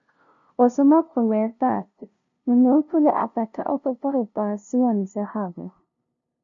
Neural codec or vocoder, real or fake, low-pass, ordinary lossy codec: codec, 16 kHz, 0.5 kbps, FunCodec, trained on LibriTTS, 25 frames a second; fake; 7.2 kHz; AAC, 64 kbps